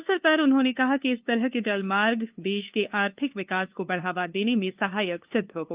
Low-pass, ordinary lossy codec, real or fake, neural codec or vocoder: 3.6 kHz; Opus, 24 kbps; fake; codec, 16 kHz, 2 kbps, FunCodec, trained on LibriTTS, 25 frames a second